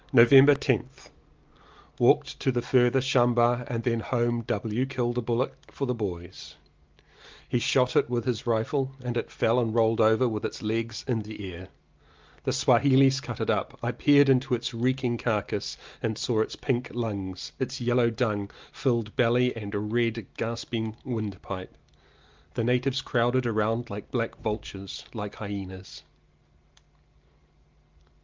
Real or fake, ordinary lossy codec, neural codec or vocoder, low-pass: real; Opus, 24 kbps; none; 7.2 kHz